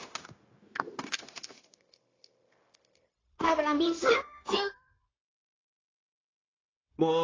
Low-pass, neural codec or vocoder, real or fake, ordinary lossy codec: 7.2 kHz; codec, 16 kHz, 0.9 kbps, LongCat-Audio-Codec; fake; AAC, 32 kbps